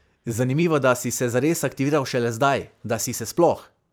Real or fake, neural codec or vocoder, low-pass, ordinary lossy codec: fake; vocoder, 44.1 kHz, 128 mel bands every 512 samples, BigVGAN v2; none; none